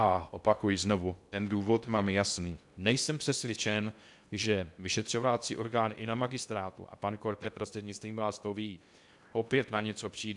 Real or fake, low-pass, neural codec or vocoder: fake; 10.8 kHz; codec, 16 kHz in and 24 kHz out, 0.6 kbps, FocalCodec, streaming, 2048 codes